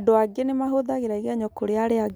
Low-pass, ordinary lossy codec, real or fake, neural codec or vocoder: none; none; real; none